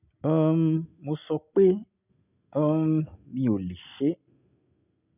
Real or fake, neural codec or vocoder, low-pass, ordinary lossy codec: real; none; 3.6 kHz; none